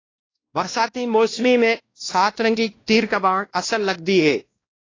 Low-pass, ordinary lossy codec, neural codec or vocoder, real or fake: 7.2 kHz; AAC, 32 kbps; codec, 16 kHz, 1 kbps, X-Codec, WavLM features, trained on Multilingual LibriSpeech; fake